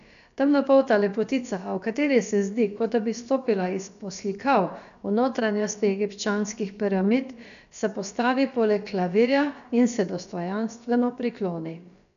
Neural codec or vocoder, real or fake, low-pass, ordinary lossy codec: codec, 16 kHz, about 1 kbps, DyCAST, with the encoder's durations; fake; 7.2 kHz; none